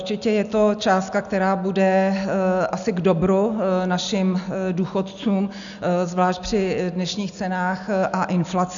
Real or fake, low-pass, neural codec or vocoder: real; 7.2 kHz; none